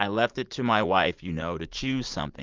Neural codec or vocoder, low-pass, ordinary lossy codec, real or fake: vocoder, 44.1 kHz, 80 mel bands, Vocos; 7.2 kHz; Opus, 32 kbps; fake